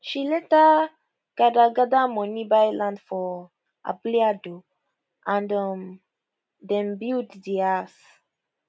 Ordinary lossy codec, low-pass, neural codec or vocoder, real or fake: none; none; none; real